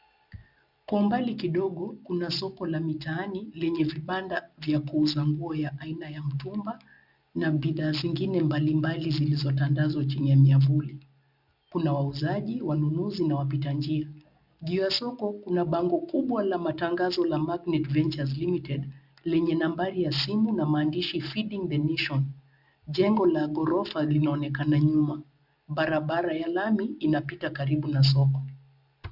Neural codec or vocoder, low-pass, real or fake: none; 5.4 kHz; real